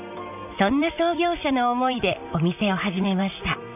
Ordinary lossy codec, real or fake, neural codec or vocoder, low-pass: none; fake; vocoder, 44.1 kHz, 128 mel bands, Pupu-Vocoder; 3.6 kHz